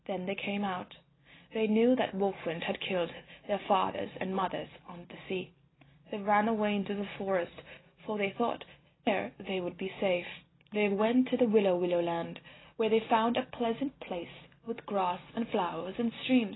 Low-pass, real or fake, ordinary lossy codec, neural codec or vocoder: 7.2 kHz; real; AAC, 16 kbps; none